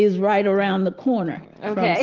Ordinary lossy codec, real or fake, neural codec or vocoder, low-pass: Opus, 16 kbps; real; none; 7.2 kHz